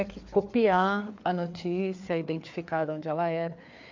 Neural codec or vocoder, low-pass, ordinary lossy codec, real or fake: codec, 16 kHz, 4 kbps, FreqCodec, larger model; 7.2 kHz; MP3, 48 kbps; fake